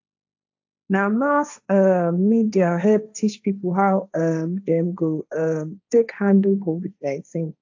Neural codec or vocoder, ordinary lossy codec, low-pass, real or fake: codec, 16 kHz, 1.1 kbps, Voila-Tokenizer; AAC, 48 kbps; 7.2 kHz; fake